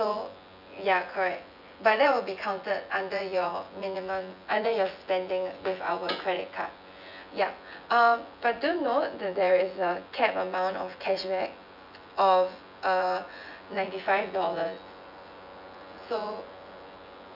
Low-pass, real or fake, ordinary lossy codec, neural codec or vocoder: 5.4 kHz; fake; none; vocoder, 24 kHz, 100 mel bands, Vocos